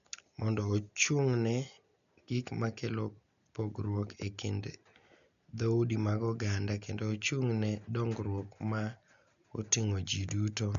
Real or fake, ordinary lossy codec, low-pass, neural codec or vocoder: real; none; 7.2 kHz; none